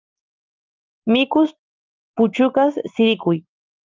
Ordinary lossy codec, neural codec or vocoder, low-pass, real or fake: Opus, 24 kbps; none; 7.2 kHz; real